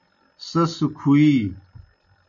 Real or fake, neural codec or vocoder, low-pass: real; none; 7.2 kHz